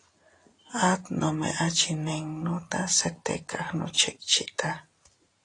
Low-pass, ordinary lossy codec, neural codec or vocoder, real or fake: 10.8 kHz; AAC, 32 kbps; none; real